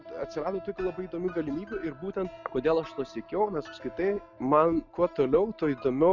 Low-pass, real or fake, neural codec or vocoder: 7.2 kHz; real; none